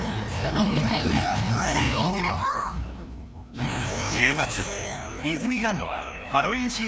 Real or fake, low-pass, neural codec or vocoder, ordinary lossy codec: fake; none; codec, 16 kHz, 1 kbps, FreqCodec, larger model; none